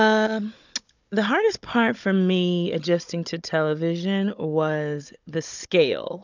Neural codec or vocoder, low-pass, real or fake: codec, 16 kHz, 16 kbps, FunCodec, trained on Chinese and English, 50 frames a second; 7.2 kHz; fake